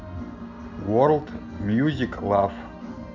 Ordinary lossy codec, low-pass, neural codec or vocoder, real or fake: none; 7.2 kHz; none; real